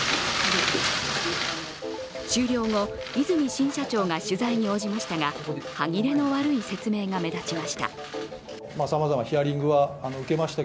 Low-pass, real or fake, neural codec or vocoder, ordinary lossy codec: none; real; none; none